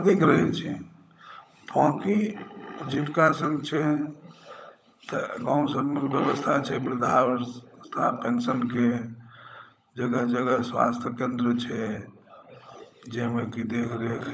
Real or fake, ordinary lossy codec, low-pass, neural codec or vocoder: fake; none; none; codec, 16 kHz, 16 kbps, FunCodec, trained on LibriTTS, 50 frames a second